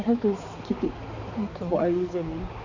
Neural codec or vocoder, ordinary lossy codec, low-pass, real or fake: codec, 16 kHz, 4 kbps, X-Codec, HuBERT features, trained on balanced general audio; none; 7.2 kHz; fake